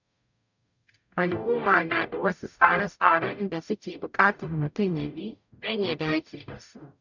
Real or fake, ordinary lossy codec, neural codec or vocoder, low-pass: fake; none; codec, 44.1 kHz, 0.9 kbps, DAC; 7.2 kHz